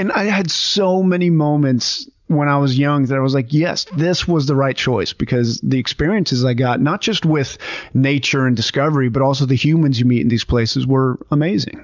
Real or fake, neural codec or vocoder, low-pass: real; none; 7.2 kHz